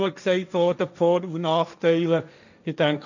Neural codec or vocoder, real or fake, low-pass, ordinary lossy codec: codec, 16 kHz, 1.1 kbps, Voila-Tokenizer; fake; 7.2 kHz; none